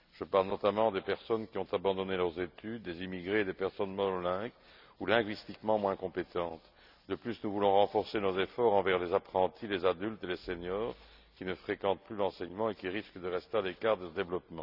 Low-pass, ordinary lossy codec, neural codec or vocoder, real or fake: 5.4 kHz; none; none; real